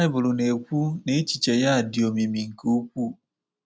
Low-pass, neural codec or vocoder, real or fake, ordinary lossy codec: none; none; real; none